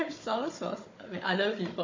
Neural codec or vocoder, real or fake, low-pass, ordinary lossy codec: codec, 16 kHz, 16 kbps, FunCodec, trained on Chinese and English, 50 frames a second; fake; 7.2 kHz; MP3, 32 kbps